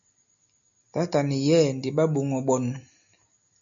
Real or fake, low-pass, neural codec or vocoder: real; 7.2 kHz; none